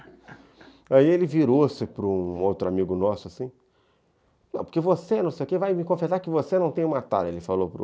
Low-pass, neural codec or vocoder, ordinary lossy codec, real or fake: none; none; none; real